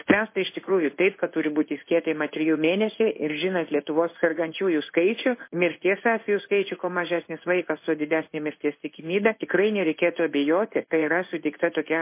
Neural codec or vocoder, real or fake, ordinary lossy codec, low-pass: codec, 16 kHz in and 24 kHz out, 1 kbps, XY-Tokenizer; fake; MP3, 24 kbps; 3.6 kHz